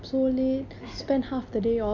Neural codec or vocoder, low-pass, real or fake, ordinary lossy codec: none; 7.2 kHz; real; none